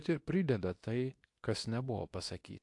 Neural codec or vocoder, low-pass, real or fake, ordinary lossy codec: codec, 24 kHz, 0.9 kbps, WavTokenizer, medium speech release version 2; 10.8 kHz; fake; AAC, 64 kbps